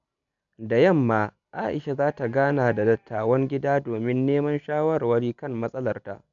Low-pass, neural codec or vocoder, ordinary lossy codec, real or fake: 7.2 kHz; none; MP3, 96 kbps; real